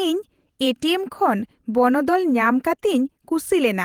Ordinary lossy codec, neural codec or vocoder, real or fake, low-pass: Opus, 24 kbps; vocoder, 48 kHz, 128 mel bands, Vocos; fake; 19.8 kHz